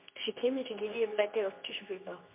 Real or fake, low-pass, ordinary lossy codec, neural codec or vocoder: fake; 3.6 kHz; MP3, 32 kbps; codec, 24 kHz, 0.9 kbps, WavTokenizer, medium speech release version 2